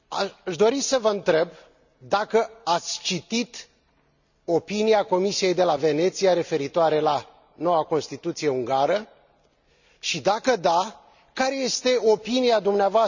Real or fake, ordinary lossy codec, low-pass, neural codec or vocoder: real; none; 7.2 kHz; none